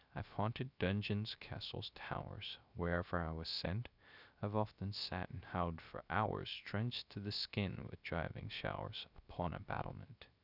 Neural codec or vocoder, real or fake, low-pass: codec, 16 kHz, 0.3 kbps, FocalCodec; fake; 5.4 kHz